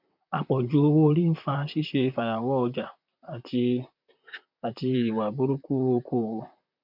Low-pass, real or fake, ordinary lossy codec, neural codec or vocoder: 5.4 kHz; fake; none; vocoder, 44.1 kHz, 128 mel bands, Pupu-Vocoder